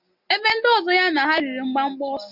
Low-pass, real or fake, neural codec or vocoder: 5.4 kHz; real; none